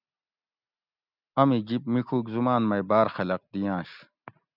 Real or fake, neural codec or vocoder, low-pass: real; none; 5.4 kHz